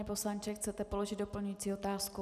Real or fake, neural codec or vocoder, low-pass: real; none; 14.4 kHz